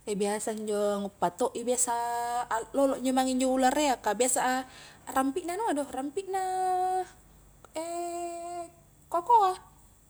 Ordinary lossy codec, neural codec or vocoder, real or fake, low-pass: none; none; real; none